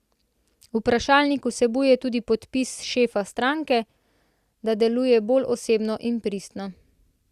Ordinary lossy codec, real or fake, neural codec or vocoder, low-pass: Opus, 64 kbps; real; none; 14.4 kHz